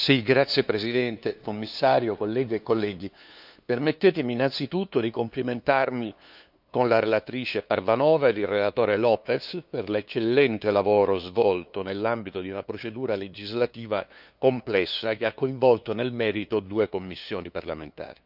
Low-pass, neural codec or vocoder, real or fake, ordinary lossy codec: 5.4 kHz; codec, 16 kHz, 2 kbps, FunCodec, trained on LibriTTS, 25 frames a second; fake; none